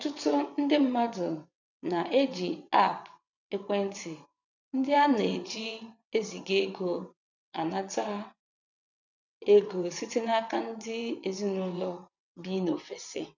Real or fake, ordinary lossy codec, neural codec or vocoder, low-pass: fake; none; vocoder, 22.05 kHz, 80 mel bands, WaveNeXt; 7.2 kHz